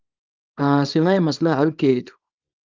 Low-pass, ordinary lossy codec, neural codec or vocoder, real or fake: 7.2 kHz; Opus, 24 kbps; codec, 24 kHz, 0.9 kbps, WavTokenizer, small release; fake